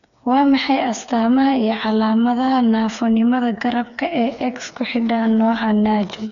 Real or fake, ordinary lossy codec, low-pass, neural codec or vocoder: fake; none; 7.2 kHz; codec, 16 kHz, 4 kbps, FreqCodec, smaller model